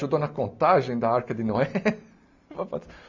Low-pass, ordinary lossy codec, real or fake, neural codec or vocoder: 7.2 kHz; MP3, 64 kbps; real; none